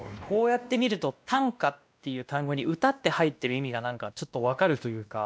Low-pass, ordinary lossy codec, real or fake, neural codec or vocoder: none; none; fake; codec, 16 kHz, 1 kbps, X-Codec, WavLM features, trained on Multilingual LibriSpeech